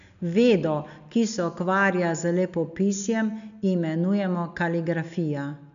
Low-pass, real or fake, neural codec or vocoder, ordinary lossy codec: 7.2 kHz; real; none; none